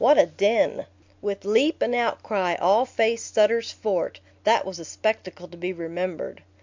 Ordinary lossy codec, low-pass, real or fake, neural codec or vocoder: MP3, 64 kbps; 7.2 kHz; real; none